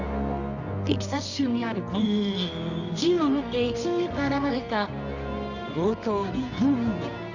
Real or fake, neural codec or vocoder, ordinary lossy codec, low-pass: fake; codec, 24 kHz, 0.9 kbps, WavTokenizer, medium music audio release; none; 7.2 kHz